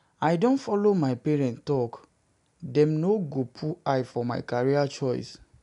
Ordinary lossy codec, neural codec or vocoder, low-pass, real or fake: none; none; 10.8 kHz; real